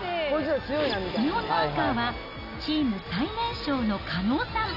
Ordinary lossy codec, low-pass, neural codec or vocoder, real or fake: none; 5.4 kHz; none; real